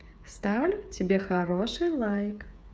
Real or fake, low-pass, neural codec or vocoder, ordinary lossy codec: fake; none; codec, 16 kHz, 8 kbps, FreqCodec, smaller model; none